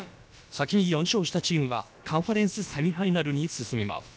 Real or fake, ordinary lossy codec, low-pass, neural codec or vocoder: fake; none; none; codec, 16 kHz, about 1 kbps, DyCAST, with the encoder's durations